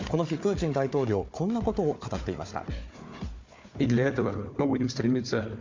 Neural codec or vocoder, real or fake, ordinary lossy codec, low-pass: codec, 16 kHz, 4 kbps, FunCodec, trained on Chinese and English, 50 frames a second; fake; MP3, 64 kbps; 7.2 kHz